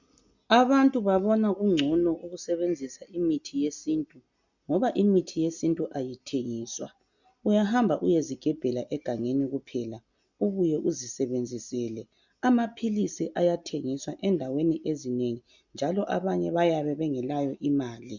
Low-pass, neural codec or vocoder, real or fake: 7.2 kHz; none; real